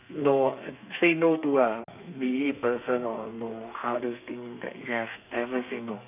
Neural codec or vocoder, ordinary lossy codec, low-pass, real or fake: codec, 32 kHz, 1.9 kbps, SNAC; none; 3.6 kHz; fake